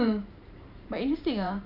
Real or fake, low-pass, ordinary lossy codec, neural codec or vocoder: fake; 5.4 kHz; none; vocoder, 44.1 kHz, 128 mel bands every 512 samples, BigVGAN v2